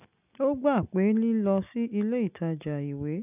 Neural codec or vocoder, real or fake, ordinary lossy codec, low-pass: none; real; none; 3.6 kHz